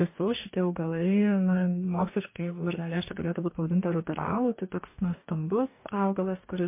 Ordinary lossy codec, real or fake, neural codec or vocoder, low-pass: MP3, 24 kbps; fake; codec, 44.1 kHz, 2.6 kbps, DAC; 3.6 kHz